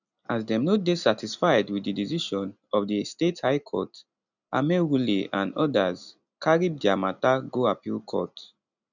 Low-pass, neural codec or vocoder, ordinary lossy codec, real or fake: 7.2 kHz; none; none; real